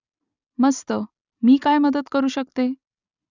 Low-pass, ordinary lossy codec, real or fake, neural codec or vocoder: 7.2 kHz; none; real; none